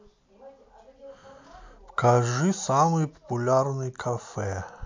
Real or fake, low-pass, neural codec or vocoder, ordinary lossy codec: real; 7.2 kHz; none; MP3, 48 kbps